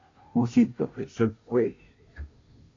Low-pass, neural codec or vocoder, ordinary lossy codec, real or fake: 7.2 kHz; codec, 16 kHz, 0.5 kbps, FunCodec, trained on Chinese and English, 25 frames a second; AAC, 32 kbps; fake